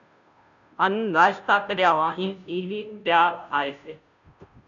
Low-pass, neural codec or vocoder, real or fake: 7.2 kHz; codec, 16 kHz, 0.5 kbps, FunCodec, trained on Chinese and English, 25 frames a second; fake